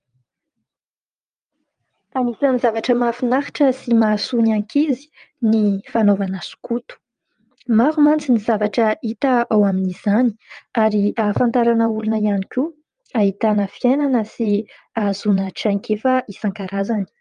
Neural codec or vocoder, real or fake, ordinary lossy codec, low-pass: vocoder, 22.05 kHz, 80 mel bands, WaveNeXt; fake; Opus, 32 kbps; 9.9 kHz